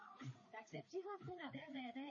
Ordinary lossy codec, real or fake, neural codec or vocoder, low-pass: MP3, 32 kbps; fake; codec, 16 kHz, 4 kbps, FreqCodec, larger model; 7.2 kHz